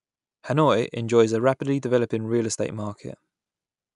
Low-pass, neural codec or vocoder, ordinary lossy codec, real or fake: 10.8 kHz; none; none; real